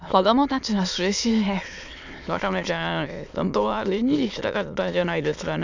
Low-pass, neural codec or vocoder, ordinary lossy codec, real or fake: 7.2 kHz; autoencoder, 22.05 kHz, a latent of 192 numbers a frame, VITS, trained on many speakers; none; fake